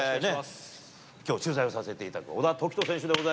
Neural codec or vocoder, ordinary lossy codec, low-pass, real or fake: none; none; none; real